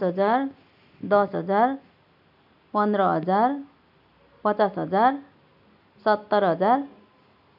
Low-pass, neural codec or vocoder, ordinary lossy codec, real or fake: 5.4 kHz; none; none; real